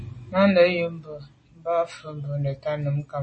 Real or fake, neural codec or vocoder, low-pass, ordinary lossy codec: real; none; 10.8 kHz; MP3, 32 kbps